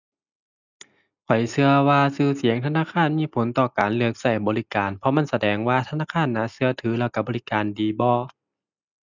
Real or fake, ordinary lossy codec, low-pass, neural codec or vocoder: real; none; 7.2 kHz; none